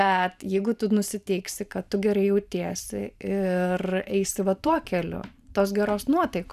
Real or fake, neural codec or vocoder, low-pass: fake; vocoder, 44.1 kHz, 128 mel bands every 512 samples, BigVGAN v2; 14.4 kHz